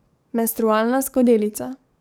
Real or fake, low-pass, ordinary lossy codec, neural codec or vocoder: fake; none; none; codec, 44.1 kHz, 7.8 kbps, DAC